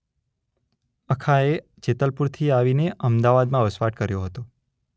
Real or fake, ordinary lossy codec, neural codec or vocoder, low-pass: real; none; none; none